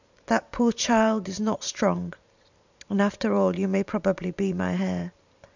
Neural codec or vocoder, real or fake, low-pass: vocoder, 44.1 kHz, 128 mel bands every 512 samples, BigVGAN v2; fake; 7.2 kHz